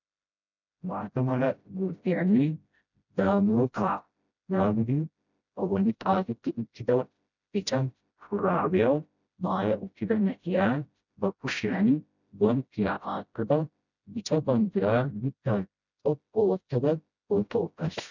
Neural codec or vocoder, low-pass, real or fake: codec, 16 kHz, 0.5 kbps, FreqCodec, smaller model; 7.2 kHz; fake